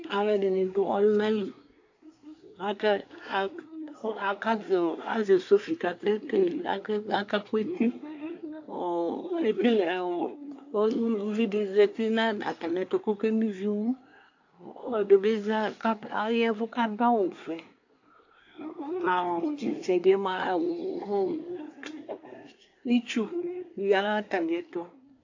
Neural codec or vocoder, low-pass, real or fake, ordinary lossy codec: codec, 24 kHz, 1 kbps, SNAC; 7.2 kHz; fake; AAC, 48 kbps